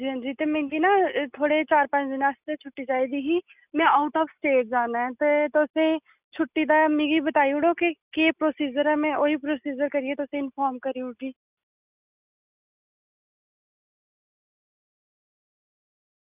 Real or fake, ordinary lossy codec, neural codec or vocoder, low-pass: real; none; none; 3.6 kHz